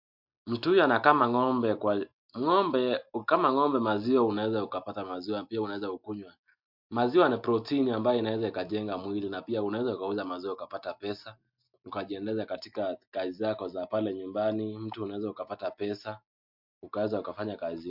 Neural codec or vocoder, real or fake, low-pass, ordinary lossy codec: none; real; 5.4 kHz; MP3, 48 kbps